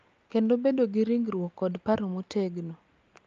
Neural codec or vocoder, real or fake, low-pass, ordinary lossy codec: none; real; 7.2 kHz; Opus, 24 kbps